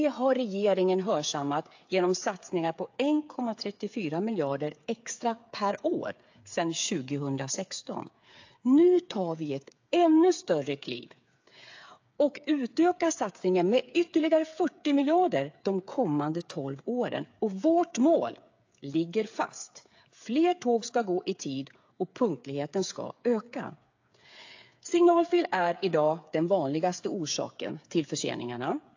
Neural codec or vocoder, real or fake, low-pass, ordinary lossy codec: codec, 16 kHz, 8 kbps, FreqCodec, smaller model; fake; 7.2 kHz; AAC, 48 kbps